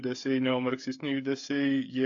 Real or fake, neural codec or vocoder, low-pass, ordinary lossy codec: fake; codec, 16 kHz, 8 kbps, FreqCodec, smaller model; 7.2 kHz; AAC, 48 kbps